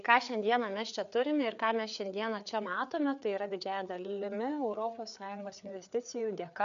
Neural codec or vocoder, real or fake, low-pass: codec, 16 kHz, 4 kbps, FreqCodec, larger model; fake; 7.2 kHz